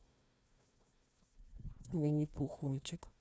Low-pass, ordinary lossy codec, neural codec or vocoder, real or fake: none; none; codec, 16 kHz, 1 kbps, FunCodec, trained on Chinese and English, 50 frames a second; fake